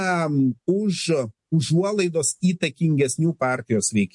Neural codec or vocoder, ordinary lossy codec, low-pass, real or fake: none; MP3, 48 kbps; 10.8 kHz; real